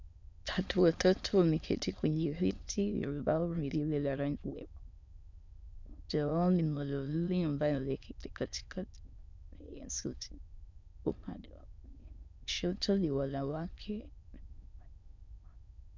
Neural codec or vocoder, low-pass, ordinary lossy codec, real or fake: autoencoder, 22.05 kHz, a latent of 192 numbers a frame, VITS, trained on many speakers; 7.2 kHz; MP3, 64 kbps; fake